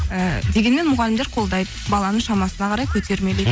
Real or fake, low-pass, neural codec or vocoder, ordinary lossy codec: real; none; none; none